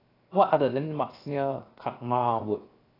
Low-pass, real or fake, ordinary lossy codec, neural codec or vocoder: 5.4 kHz; fake; AAC, 24 kbps; codec, 16 kHz, 0.7 kbps, FocalCodec